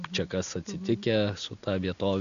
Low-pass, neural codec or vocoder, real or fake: 7.2 kHz; none; real